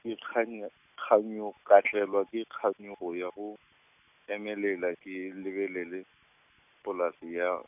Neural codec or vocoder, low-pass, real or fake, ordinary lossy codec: none; 3.6 kHz; real; none